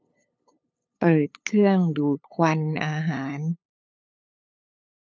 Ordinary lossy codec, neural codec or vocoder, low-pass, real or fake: none; codec, 16 kHz, 8 kbps, FunCodec, trained on LibriTTS, 25 frames a second; none; fake